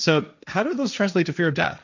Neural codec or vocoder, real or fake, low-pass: codec, 16 kHz, 1.1 kbps, Voila-Tokenizer; fake; 7.2 kHz